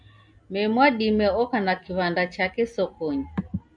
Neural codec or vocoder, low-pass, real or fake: none; 9.9 kHz; real